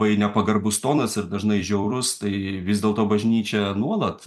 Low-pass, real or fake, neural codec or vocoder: 14.4 kHz; fake; vocoder, 44.1 kHz, 128 mel bands every 256 samples, BigVGAN v2